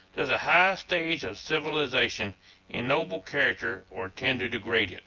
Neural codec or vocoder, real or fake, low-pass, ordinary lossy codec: vocoder, 24 kHz, 100 mel bands, Vocos; fake; 7.2 kHz; Opus, 24 kbps